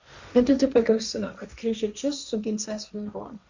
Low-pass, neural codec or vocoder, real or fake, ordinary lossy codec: 7.2 kHz; codec, 16 kHz, 1.1 kbps, Voila-Tokenizer; fake; Opus, 64 kbps